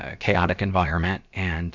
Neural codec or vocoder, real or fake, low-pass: codec, 16 kHz, about 1 kbps, DyCAST, with the encoder's durations; fake; 7.2 kHz